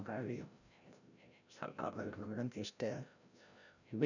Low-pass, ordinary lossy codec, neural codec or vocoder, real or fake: 7.2 kHz; none; codec, 16 kHz, 0.5 kbps, FreqCodec, larger model; fake